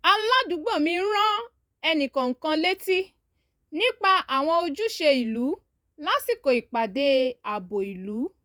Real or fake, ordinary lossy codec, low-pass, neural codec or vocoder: fake; none; none; vocoder, 48 kHz, 128 mel bands, Vocos